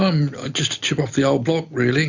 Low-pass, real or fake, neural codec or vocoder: 7.2 kHz; real; none